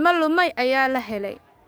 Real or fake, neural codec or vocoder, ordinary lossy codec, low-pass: fake; codec, 44.1 kHz, 7.8 kbps, DAC; none; none